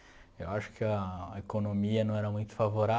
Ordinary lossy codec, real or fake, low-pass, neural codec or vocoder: none; real; none; none